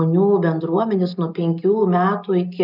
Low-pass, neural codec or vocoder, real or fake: 5.4 kHz; none; real